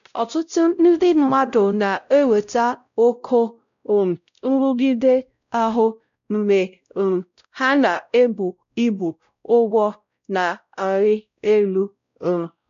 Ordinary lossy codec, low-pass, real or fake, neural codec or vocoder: none; 7.2 kHz; fake; codec, 16 kHz, 0.5 kbps, X-Codec, WavLM features, trained on Multilingual LibriSpeech